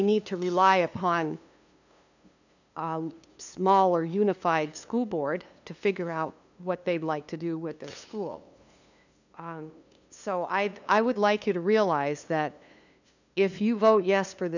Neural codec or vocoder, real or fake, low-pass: codec, 16 kHz, 2 kbps, FunCodec, trained on LibriTTS, 25 frames a second; fake; 7.2 kHz